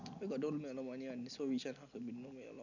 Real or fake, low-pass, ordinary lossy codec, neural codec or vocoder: real; 7.2 kHz; none; none